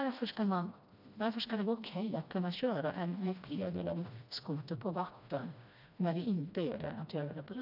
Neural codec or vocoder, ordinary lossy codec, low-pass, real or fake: codec, 16 kHz, 1 kbps, FreqCodec, smaller model; none; 5.4 kHz; fake